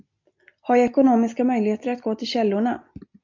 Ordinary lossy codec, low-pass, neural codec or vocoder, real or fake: MP3, 64 kbps; 7.2 kHz; none; real